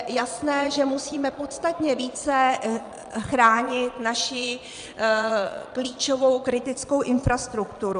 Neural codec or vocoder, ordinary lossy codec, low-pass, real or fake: vocoder, 22.05 kHz, 80 mel bands, Vocos; MP3, 96 kbps; 9.9 kHz; fake